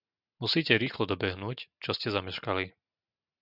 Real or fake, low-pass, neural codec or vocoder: real; 5.4 kHz; none